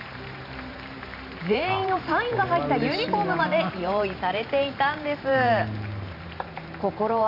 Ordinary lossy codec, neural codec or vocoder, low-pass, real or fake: none; vocoder, 44.1 kHz, 128 mel bands every 512 samples, BigVGAN v2; 5.4 kHz; fake